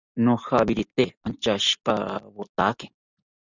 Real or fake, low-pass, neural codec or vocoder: real; 7.2 kHz; none